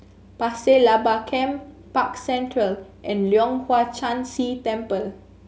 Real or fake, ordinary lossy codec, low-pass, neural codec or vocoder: real; none; none; none